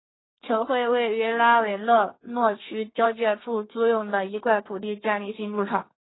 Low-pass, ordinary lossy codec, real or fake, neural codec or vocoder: 7.2 kHz; AAC, 16 kbps; fake; codec, 32 kHz, 1.9 kbps, SNAC